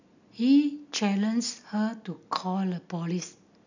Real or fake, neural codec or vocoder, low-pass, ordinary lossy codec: real; none; 7.2 kHz; none